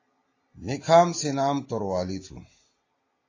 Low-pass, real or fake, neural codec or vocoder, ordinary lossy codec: 7.2 kHz; real; none; AAC, 32 kbps